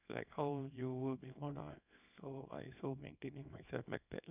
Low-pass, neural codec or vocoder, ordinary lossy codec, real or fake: 3.6 kHz; codec, 24 kHz, 0.9 kbps, WavTokenizer, small release; none; fake